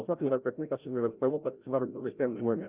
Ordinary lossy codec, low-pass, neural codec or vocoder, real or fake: Opus, 24 kbps; 3.6 kHz; codec, 16 kHz, 0.5 kbps, FreqCodec, larger model; fake